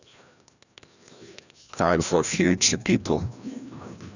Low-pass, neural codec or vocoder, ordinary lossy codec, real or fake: 7.2 kHz; codec, 16 kHz, 1 kbps, FreqCodec, larger model; none; fake